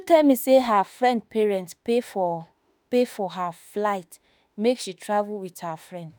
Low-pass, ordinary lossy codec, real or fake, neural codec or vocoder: none; none; fake; autoencoder, 48 kHz, 32 numbers a frame, DAC-VAE, trained on Japanese speech